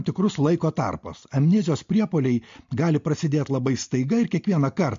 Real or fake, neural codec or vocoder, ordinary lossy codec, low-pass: real; none; MP3, 48 kbps; 7.2 kHz